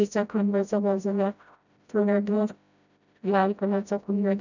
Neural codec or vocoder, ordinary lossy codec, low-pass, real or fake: codec, 16 kHz, 0.5 kbps, FreqCodec, smaller model; none; 7.2 kHz; fake